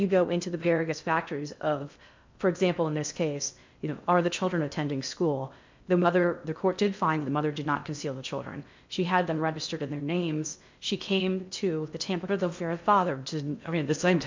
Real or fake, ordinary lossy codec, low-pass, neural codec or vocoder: fake; MP3, 64 kbps; 7.2 kHz; codec, 16 kHz in and 24 kHz out, 0.6 kbps, FocalCodec, streaming, 2048 codes